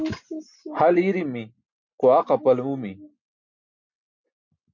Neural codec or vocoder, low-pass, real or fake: none; 7.2 kHz; real